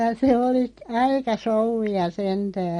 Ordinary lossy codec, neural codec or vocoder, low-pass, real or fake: MP3, 48 kbps; none; 10.8 kHz; real